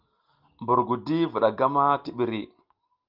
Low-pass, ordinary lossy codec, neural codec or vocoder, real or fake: 5.4 kHz; Opus, 32 kbps; none; real